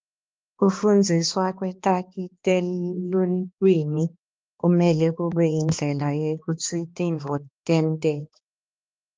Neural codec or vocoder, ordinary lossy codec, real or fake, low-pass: codec, 16 kHz, 2 kbps, X-Codec, HuBERT features, trained on balanced general audio; Opus, 24 kbps; fake; 7.2 kHz